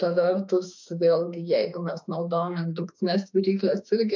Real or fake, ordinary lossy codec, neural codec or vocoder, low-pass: fake; MP3, 64 kbps; autoencoder, 48 kHz, 32 numbers a frame, DAC-VAE, trained on Japanese speech; 7.2 kHz